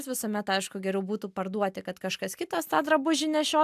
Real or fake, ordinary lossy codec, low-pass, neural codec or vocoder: real; AAC, 96 kbps; 14.4 kHz; none